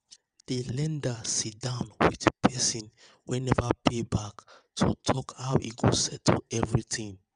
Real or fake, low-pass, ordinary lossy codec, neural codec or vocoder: fake; 9.9 kHz; none; vocoder, 22.05 kHz, 80 mel bands, WaveNeXt